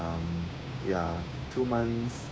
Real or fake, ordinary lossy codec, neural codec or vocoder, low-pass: fake; none; codec, 16 kHz, 6 kbps, DAC; none